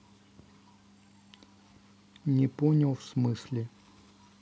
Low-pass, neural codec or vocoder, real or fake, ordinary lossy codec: none; none; real; none